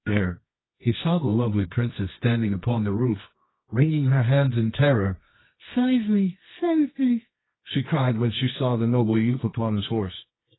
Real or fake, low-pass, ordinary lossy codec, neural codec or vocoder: fake; 7.2 kHz; AAC, 16 kbps; codec, 24 kHz, 0.9 kbps, WavTokenizer, medium music audio release